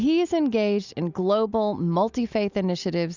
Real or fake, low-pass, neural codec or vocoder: real; 7.2 kHz; none